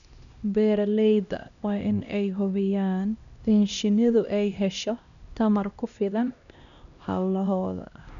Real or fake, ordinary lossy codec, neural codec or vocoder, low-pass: fake; none; codec, 16 kHz, 1 kbps, X-Codec, HuBERT features, trained on LibriSpeech; 7.2 kHz